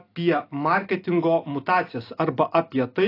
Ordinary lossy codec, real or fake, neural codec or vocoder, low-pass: AAC, 32 kbps; real; none; 5.4 kHz